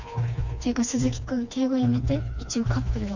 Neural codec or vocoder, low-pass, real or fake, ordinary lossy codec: codec, 16 kHz, 2 kbps, FreqCodec, smaller model; 7.2 kHz; fake; none